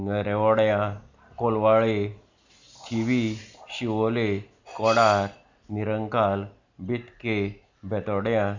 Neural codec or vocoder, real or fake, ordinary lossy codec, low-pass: none; real; Opus, 64 kbps; 7.2 kHz